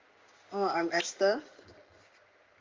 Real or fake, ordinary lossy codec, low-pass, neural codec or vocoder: fake; Opus, 32 kbps; 7.2 kHz; codec, 16 kHz in and 24 kHz out, 1 kbps, XY-Tokenizer